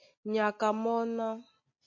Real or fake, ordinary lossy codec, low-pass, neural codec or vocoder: real; MP3, 32 kbps; 7.2 kHz; none